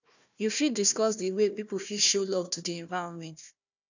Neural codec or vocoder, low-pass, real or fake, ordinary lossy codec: codec, 16 kHz, 1 kbps, FunCodec, trained on Chinese and English, 50 frames a second; 7.2 kHz; fake; none